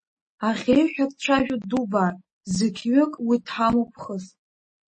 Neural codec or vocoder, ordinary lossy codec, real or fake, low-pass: none; MP3, 32 kbps; real; 10.8 kHz